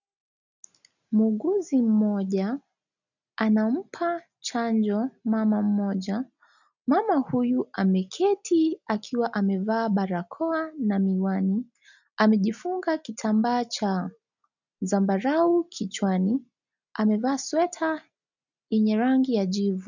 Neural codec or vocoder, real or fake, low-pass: none; real; 7.2 kHz